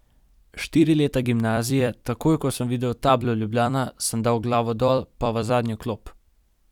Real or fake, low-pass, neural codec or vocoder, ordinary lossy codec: fake; 19.8 kHz; vocoder, 44.1 kHz, 128 mel bands every 256 samples, BigVGAN v2; none